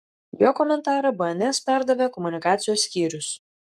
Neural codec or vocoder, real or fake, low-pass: codec, 44.1 kHz, 7.8 kbps, Pupu-Codec; fake; 14.4 kHz